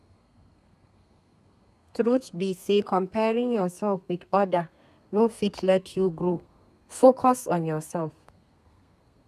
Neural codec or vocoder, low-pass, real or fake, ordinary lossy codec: codec, 32 kHz, 1.9 kbps, SNAC; 14.4 kHz; fake; none